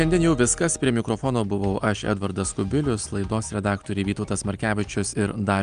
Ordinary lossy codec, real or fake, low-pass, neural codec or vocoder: Opus, 32 kbps; real; 9.9 kHz; none